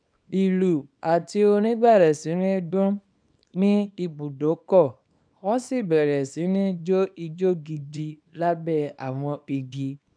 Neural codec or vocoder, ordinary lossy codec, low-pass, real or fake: codec, 24 kHz, 0.9 kbps, WavTokenizer, small release; none; 9.9 kHz; fake